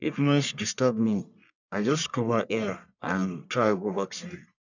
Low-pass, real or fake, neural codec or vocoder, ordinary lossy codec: 7.2 kHz; fake; codec, 44.1 kHz, 1.7 kbps, Pupu-Codec; none